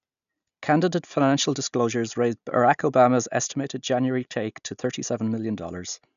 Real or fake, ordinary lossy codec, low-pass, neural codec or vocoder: real; none; 7.2 kHz; none